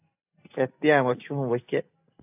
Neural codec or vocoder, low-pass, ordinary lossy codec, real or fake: none; 3.6 kHz; AAC, 32 kbps; real